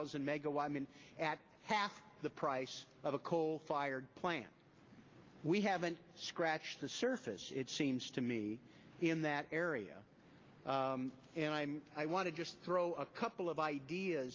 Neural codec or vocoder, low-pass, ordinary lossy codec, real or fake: none; 7.2 kHz; Opus, 32 kbps; real